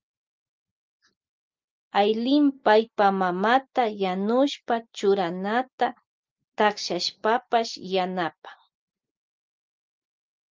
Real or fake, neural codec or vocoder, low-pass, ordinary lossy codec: real; none; 7.2 kHz; Opus, 24 kbps